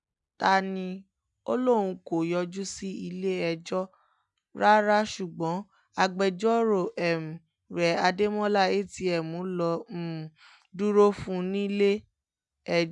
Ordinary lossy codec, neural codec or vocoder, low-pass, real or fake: none; none; 10.8 kHz; real